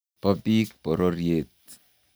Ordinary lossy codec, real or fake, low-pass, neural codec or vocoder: none; real; none; none